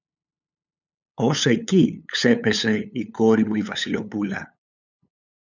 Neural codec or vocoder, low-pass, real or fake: codec, 16 kHz, 8 kbps, FunCodec, trained on LibriTTS, 25 frames a second; 7.2 kHz; fake